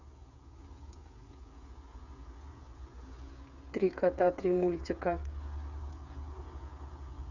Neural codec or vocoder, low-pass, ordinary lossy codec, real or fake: codec, 16 kHz, 8 kbps, FreqCodec, smaller model; 7.2 kHz; none; fake